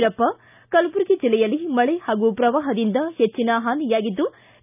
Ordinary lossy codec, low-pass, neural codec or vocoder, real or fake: none; 3.6 kHz; none; real